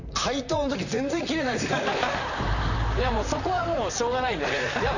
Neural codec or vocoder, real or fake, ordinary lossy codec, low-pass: vocoder, 44.1 kHz, 128 mel bands, Pupu-Vocoder; fake; none; 7.2 kHz